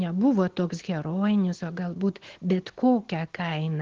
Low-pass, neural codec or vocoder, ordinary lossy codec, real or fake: 7.2 kHz; none; Opus, 16 kbps; real